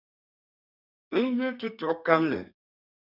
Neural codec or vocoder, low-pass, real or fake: codec, 32 kHz, 1.9 kbps, SNAC; 5.4 kHz; fake